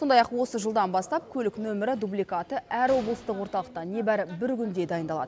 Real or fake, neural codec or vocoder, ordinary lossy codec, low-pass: real; none; none; none